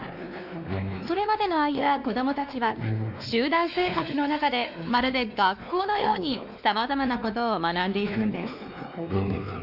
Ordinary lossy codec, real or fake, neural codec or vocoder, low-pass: none; fake; codec, 16 kHz, 2 kbps, X-Codec, WavLM features, trained on Multilingual LibriSpeech; 5.4 kHz